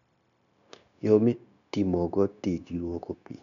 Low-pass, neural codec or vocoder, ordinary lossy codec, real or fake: 7.2 kHz; codec, 16 kHz, 0.9 kbps, LongCat-Audio-Codec; MP3, 96 kbps; fake